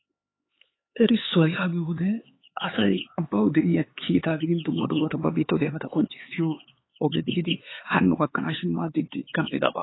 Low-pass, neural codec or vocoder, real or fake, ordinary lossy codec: 7.2 kHz; codec, 16 kHz, 4 kbps, X-Codec, HuBERT features, trained on LibriSpeech; fake; AAC, 16 kbps